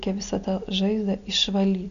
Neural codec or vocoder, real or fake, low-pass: none; real; 7.2 kHz